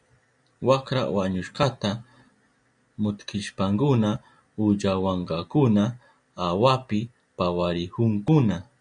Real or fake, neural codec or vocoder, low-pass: real; none; 9.9 kHz